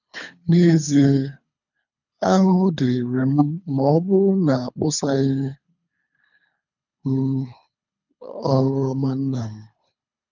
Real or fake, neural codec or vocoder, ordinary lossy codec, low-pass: fake; codec, 24 kHz, 3 kbps, HILCodec; none; 7.2 kHz